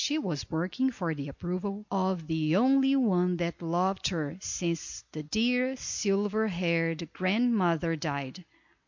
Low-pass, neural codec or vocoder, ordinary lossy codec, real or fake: 7.2 kHz; none; MP3, 48 kbps; real